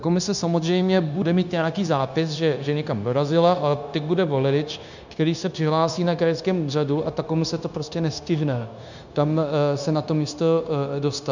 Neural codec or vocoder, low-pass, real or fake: codec, 16 kHz, 0.9 kbps, LongCat-Audio-Codec; 7.2 kHz; fake